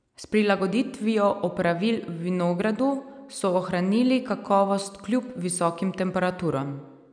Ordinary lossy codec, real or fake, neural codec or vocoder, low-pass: none; real; none; 9.9 kHz